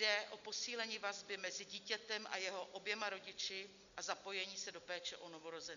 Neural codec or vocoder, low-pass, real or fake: none; 7.2 kHz; real